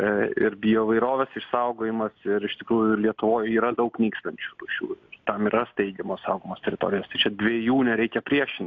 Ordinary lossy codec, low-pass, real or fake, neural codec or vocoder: AAC, 48 kbps; 7.2 kHz; real; none